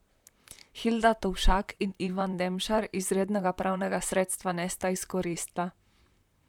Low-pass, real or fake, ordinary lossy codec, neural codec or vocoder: 19.8 kHz; fake; none; vocoder, 44.1 kHz, 128 mel bands, Pupu-Vocoder